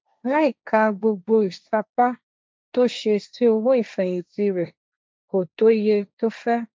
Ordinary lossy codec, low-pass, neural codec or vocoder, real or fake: none; none; codec, 16 kHz, 1.1 kbps, Voila-Tokenizer; fake